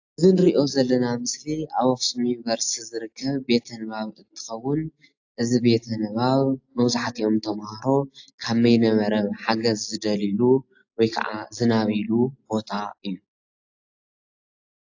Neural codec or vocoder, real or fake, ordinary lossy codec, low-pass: none; real; AAC, 48 kbps; 7.2 kHz